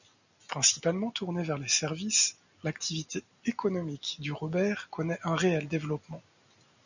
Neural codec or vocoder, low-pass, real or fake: none; 7.2 kHz; real